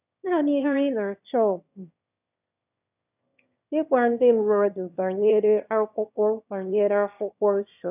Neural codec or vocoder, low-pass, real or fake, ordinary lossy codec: autoencoder, 22.05 kHz, a latent of 192 numbers a frame, VITS, trained on one speaker; 3.6 kHz; fake; none